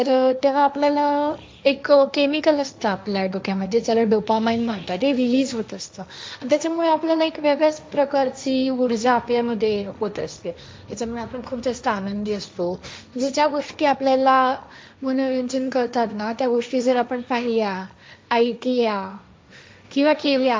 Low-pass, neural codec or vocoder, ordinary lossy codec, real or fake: none; codec, 16 kHz, 1.1 kbps, Voila-Tokenizer; none; fake